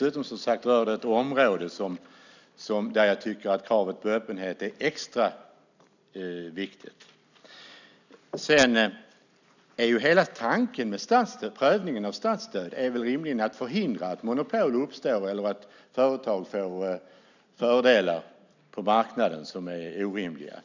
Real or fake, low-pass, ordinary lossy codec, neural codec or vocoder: real; 7.2 kHz; none; none